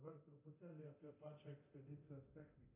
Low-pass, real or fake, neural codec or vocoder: 3.6 kHz; fake; codec, 24 kHz, 0.9 kbps, DualCodec